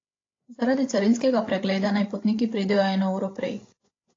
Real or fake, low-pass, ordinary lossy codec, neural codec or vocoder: fake; 7.2 kHz; AAC, 32 kbps; codec, 16 kHz, 8 kbps, FreqCodec, larger model